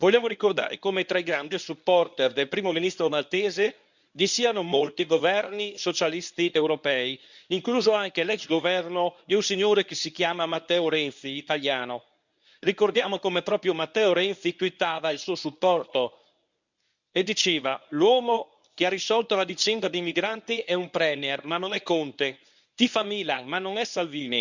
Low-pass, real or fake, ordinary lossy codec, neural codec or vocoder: 7.2 kHz; fake; none; codec, 24 kHz, 0.9 kbps, WavTokenizer, medium speech release version 1